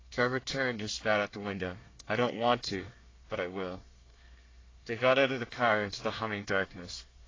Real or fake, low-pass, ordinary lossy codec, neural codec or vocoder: fake; 7.2 kHz; AAC, 32 kbps; codec, 24 kHz, 1 kbps, SNAC